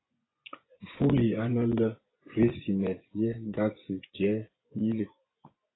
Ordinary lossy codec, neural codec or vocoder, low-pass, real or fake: AAC, 16 kbps; vocoder, 24 kHz, 100 mel bands, Vocos; 7.2 kHz; fake